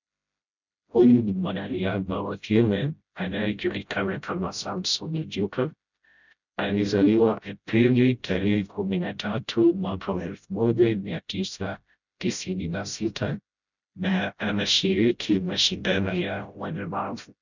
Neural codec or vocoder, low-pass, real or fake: codec, 16 kHz, 0.5 kbps, FreqCodec, smaller model; 7.2 kHz; fake